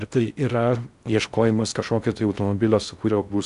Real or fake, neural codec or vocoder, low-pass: fake; codec, 16 kHz in and 24 kHz out, 0.6 kbps, FocalCodec, streaming, 4096 codes; 10.8 kHz